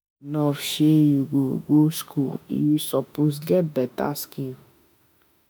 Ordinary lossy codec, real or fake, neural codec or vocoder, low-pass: none; fake; autoencoder, 48 kHz, 32 numbers a frame, DAC-VAE, trained on Japanese speech; none